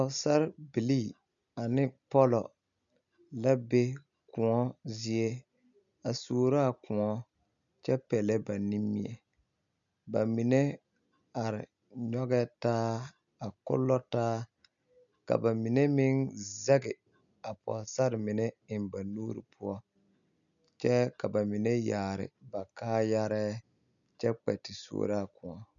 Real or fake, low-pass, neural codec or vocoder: real; 7.2 kHz; none